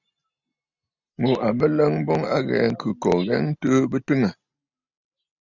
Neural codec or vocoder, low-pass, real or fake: none; 7.2 kHz; real